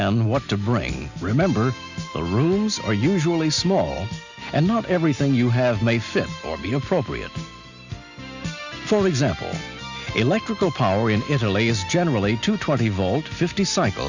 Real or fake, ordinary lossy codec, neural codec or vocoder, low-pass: real; Opus, 64 kbps; none; 7.2 kHz